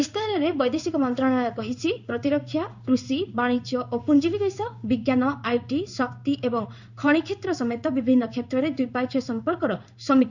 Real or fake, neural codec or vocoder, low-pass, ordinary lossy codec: fake; codec, 16 kHz in and 24 kHz out, 1 kbps, XY-Tokenizer; 7.2 kHz; none